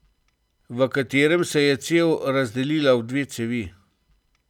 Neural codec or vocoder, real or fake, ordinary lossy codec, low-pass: none; real; none; 19.8 kHz